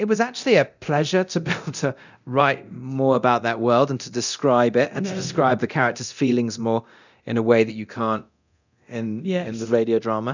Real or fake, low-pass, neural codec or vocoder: fake; 7.2 kHz; codec, 24 kHz, 0.9 kbps, DualCodec